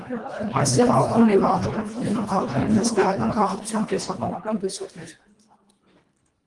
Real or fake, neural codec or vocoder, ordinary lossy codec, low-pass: fake; codec, 24 kHz, 1.5 kbps, HILCodec; Opus, 24 kbps; 10.8 kHz